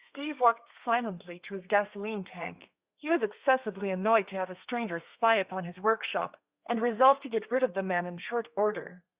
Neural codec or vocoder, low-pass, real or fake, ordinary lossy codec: codec, 32 kHz, 1.9 kbps, SNAC; 3.6 kHz; fake; Opus, 64 kbps